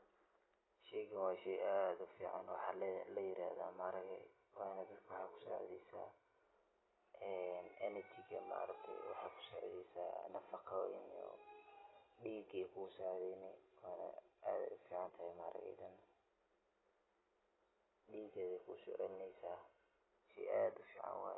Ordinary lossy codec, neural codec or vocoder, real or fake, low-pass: AAC, 16 kbps; none; real; 7.2 kHz